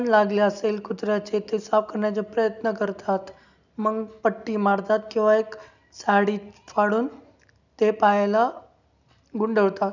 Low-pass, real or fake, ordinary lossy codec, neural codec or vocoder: 7.2 kHz; real; none; none